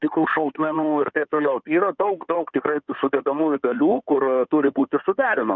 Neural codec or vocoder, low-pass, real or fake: codec, 16 kHz in and 24 kHz out, 2.2 kbps, FireRedTTS-2 codec; 7.2 kHz; fake